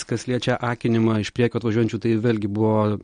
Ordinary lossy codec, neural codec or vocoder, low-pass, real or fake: MP3, 48 kbps; none; 9.9 kHz; real